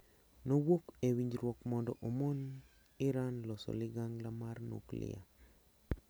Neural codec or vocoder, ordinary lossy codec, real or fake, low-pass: none; none; real; none